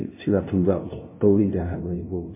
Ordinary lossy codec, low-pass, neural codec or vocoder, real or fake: MP3, 16 kbps; 3.6 kHz; codec, 16 kHz, 0.5 kbps, FunCodec, trained on LibriTTS, 25 frames a second; fake